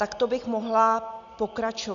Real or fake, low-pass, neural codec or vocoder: real; 7.2 kHz; none